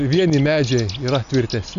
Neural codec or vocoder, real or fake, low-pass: none; real; 7.2 kHz